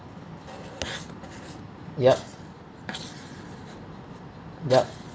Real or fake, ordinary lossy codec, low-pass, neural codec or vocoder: real; none; none; none